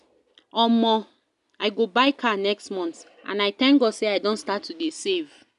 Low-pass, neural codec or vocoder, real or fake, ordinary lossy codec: 10.8 kHz; none; real; none